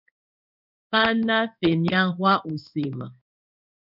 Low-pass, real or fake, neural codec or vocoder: 5.4 kHz; fake; codec, 16 kHz in and 24 kHz out, 1 kbps, XY-Tokenizer